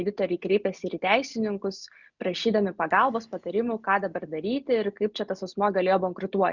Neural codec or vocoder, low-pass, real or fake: none; 7.2 kHz; real